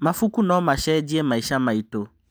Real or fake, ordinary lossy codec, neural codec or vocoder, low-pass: real; none; none; none